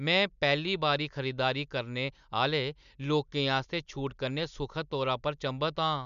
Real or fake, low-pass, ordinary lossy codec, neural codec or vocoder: real; 7.2 kHz; MP3, 96 kbps; none